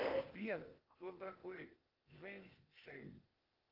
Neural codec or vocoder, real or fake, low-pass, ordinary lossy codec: codec, 16 kHz, 0.8 kbps, ZipCodec; fake; 5.4 kHz; Opus, 32 kbps